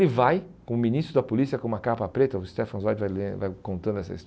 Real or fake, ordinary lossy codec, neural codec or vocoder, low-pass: real; none; none; none